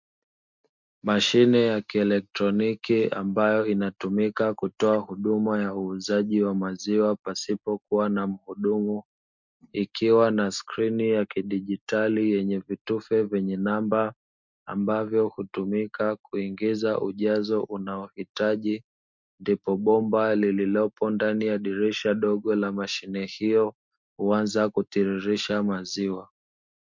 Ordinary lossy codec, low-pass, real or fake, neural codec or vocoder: MP3, 64 kbps; 7.2 kHz; real; none